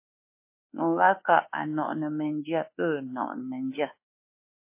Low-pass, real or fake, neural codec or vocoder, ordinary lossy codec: 3.6 kHz; fake; codec, 24 kHz, 1.2 kbps, DualCodec; MP3, 24 kbps